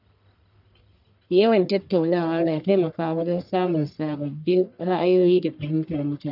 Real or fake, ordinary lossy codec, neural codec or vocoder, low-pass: fake; none; codec, 44.1 kHz, 1.7 kbps, Pupu-Codec; 5.4 kHz